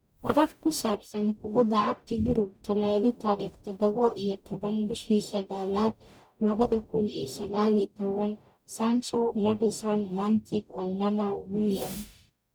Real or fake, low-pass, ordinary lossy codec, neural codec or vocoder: fake; none; none; codec, 44.1 kHz, 0.9 kbps, DAC